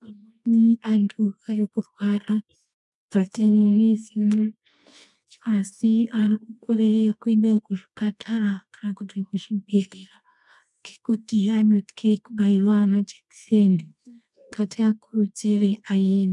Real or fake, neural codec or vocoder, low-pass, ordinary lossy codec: fake; codec, 24 kHz, 0.9 kbps, WavTokenizer, medium music audio release; 10.8 kHz; MP3, 96 kbps